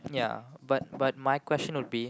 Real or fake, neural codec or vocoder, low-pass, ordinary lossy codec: real; none; none; none